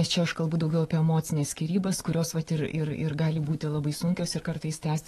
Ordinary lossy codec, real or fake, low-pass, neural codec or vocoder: AAC, 32 kbps; fake; 19.8 kHz; autoencoder, 48 kHz, 128 numbers a frame, DAC-VAE, trained on Japanese speech